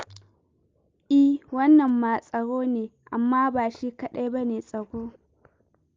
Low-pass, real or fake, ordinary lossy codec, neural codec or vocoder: 7.2 kHz; real; Opus, 32 kbps; none